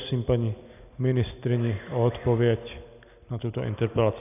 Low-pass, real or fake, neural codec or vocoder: 3.6 kHz; real; none